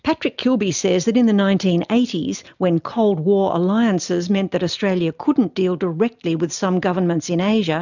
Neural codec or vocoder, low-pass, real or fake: none; 7.2 kHz; real